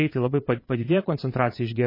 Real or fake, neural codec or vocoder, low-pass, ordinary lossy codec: fake; vocoder, 22.05 kHz, 80 mel bands, Vocos; 5.4 kHz; MP3, 24 kbps